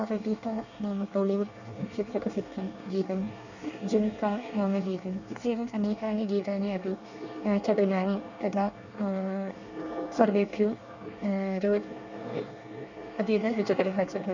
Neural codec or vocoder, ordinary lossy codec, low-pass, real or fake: codec, 24 kHz, 1 kbps, SNAC; none; 7.2 kHz; fake